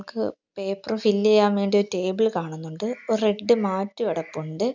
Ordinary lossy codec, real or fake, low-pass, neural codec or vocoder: none; real; 7.2 kHz; none